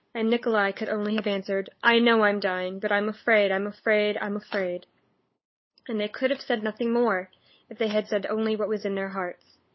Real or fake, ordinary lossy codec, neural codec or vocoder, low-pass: fake; MP3, 24 kbps; codec, 16 kHz, 8 kbps, FunCodec, trained on LibriTTS, 25 frames a second; 7.2 kHz